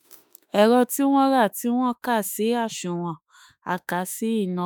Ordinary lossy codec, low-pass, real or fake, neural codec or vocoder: none; none; fake; autoencoder, 48 kHz, 32 numbers a frame, DAC-VAE, trained on Japanese speech